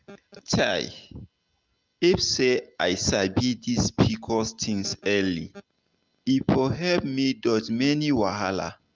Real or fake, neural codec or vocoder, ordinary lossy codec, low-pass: real; none; Opus, 24 kbps; 7.2 kHz